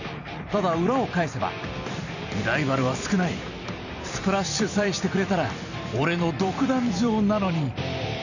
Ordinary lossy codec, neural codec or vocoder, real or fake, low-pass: none; vocoder, 44.1 kHz, 128 mel bands every 256 samples, BigVGAN v2; fake; 7.2 kHz